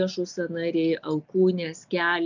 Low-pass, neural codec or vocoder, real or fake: 7.2 kHz; none; real